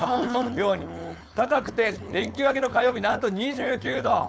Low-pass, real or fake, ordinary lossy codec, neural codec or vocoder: none; fake; none; codec, 16 kHz, 4.8 kbps, FACodec